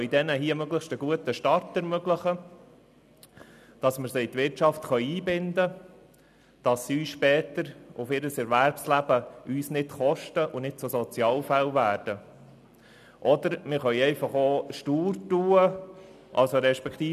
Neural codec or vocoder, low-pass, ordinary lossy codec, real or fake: none; 14.4 kHz; none; real